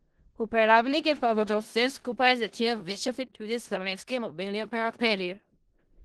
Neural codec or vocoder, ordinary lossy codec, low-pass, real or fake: codec, 16 kHz in and 24 kHz out, 0.4 kbps, LongCat-Audio-Codec, four codebook decoder; Opus, 16 kbps; 10.8 kHz; fake